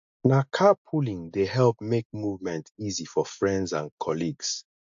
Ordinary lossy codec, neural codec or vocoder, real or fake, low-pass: AAC, 64 kbps; none; real; 7.2 kHz